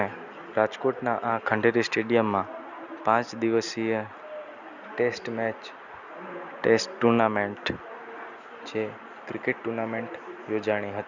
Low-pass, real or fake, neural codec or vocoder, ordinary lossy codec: 7.2 kHz; real; none; none